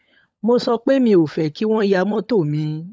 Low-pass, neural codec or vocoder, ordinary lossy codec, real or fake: none; codec, 16 kHz, 16 kbps, FunCodec, trained on LibriTTS, 50 frames a second; none; fake